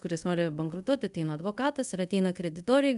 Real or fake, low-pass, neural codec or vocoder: fake; 10.8 kHz; codec, 24 kHz, 0.5 kbps, DualCodec